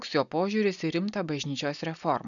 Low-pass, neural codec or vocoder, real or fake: 7.2 kHz; none; real